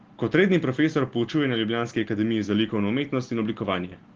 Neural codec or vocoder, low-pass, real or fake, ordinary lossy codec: none; 7.2 kHz; real; Opus, 16 kbps